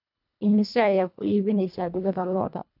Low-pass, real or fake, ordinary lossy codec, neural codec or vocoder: 5.4 kHz; fake; none; codec, 24 kHz, 1.5 kbps, HILCodec